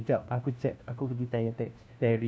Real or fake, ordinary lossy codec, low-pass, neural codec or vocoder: fake; none; none; codec, 16 kHz, 1 kbps, FunCodec, trained on LibriTTS, 50 frames a second